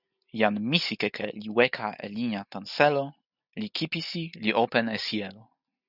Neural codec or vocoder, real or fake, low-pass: none; real; 5.4 kHz